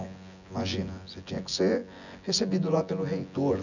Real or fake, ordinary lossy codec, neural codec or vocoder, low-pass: fake; none; vocoder, 24 kHz, 100 mel bands, Vocos; 7.2 kHz